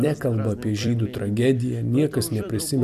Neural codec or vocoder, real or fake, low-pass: vocoder, 44.1 kHz, 128 mel bands every 256 samples, BigVGAN v2; fake; 14.4 kHz